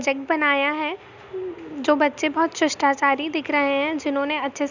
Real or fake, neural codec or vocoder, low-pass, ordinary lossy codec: real; none; 7.2 kHz; none